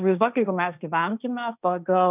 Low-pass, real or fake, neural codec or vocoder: 3.6 kHz; fake; codec, 16 kHz, 1.1 kbps, Voila-Tokenizer